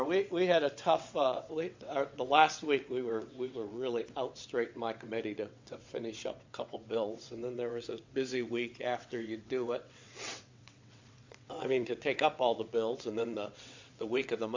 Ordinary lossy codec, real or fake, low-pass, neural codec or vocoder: MP3, 64 kbps; fake; 7.2 kHz; vocoder, 22.05 kHz, 80 mel bands, WaveNeXt